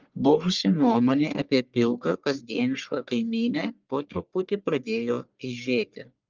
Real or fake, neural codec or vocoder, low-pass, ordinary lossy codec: fake; codec, 44.1 kHz, 1.7 kbps, Pupu-Codec; 7.2 kHz; Opus, 32 kbps